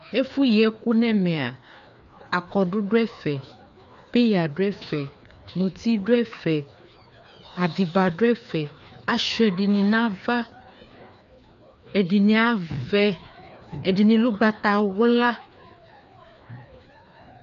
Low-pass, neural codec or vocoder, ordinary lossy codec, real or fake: 7.2 kHz; codec, 16 kHz, 2 kbps, FreqCodec, larger model; MP3, 64 kbps; fake